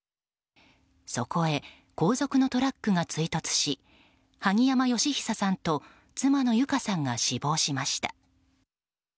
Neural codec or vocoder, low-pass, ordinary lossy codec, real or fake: none; none; none; real